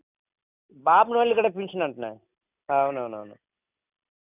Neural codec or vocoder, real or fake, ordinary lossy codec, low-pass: none; real; none; 3.6 kHz